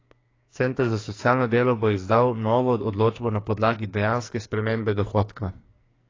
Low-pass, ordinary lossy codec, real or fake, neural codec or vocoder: 7.2 kHz; AAC, 32 kbps; fake; codec, 44.1 kHz, 2.6 kbps, SNAC